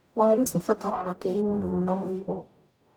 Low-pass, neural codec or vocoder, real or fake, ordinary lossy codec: none; codec, 44.1 kHz, 0.9 kbps, DAC; fake; none